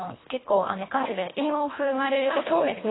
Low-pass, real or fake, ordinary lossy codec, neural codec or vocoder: 7.2 kHz; fake; AAC, 16 kbps; codec, 24 kHz, 1.5 kbps, HILCodec